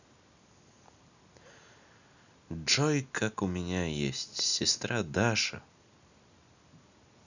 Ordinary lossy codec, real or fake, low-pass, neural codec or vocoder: none; real; 7.2 kHz; none